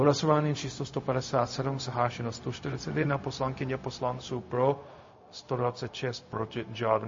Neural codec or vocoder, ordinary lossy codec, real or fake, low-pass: codec, 16 kHz, 0.4 kbps, LongCat-Audio-Codec; MP3, 32 kbps; fake; 7.2 kHz